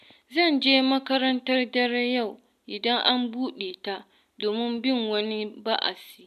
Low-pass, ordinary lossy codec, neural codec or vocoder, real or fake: 14.4 kHz; none; none; real